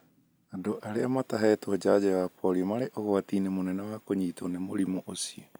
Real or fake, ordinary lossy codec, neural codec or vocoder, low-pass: real; none; none; none